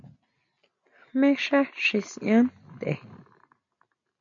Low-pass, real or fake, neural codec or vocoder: 7.2 kHz; real; none